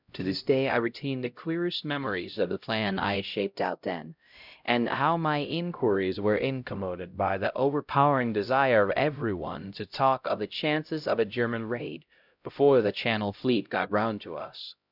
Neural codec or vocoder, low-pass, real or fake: codec, 16 kHz, 0.5 kbps, X-Codec, HuBERT features, trained on LibriSpeech; 5.4 kHz; fake